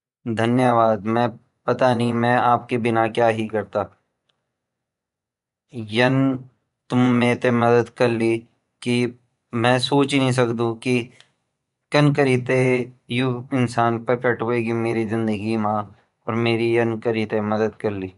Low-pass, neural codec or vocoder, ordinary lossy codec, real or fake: 10.8 kHz; vocoder, 24 kHz, 100 mel bands, Vocos; none; fake